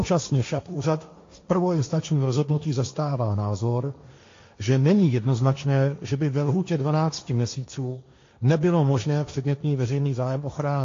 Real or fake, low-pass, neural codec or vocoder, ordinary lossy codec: fake; 7.2 kHz; codec, 16 kHz, 1.1 kbps, Voila-Tokenizer; AAC, 48 kbps